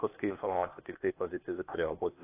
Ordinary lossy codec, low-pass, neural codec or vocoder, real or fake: AAC, 24 kbps; 3.6 kHz; codec, 16 kHz, 0.8 kbps, ZipCodec; fake